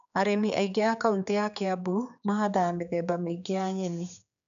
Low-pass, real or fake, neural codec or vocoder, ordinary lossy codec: 7.2 kHz; fake; codec, 16 kHz, 4 kbps, X-Codec, HuBERT features, trained on general audio; none